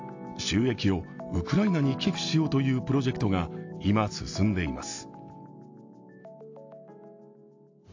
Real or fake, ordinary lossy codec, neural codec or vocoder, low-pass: real; AAC, 48 kbps; none; 7.2 kHz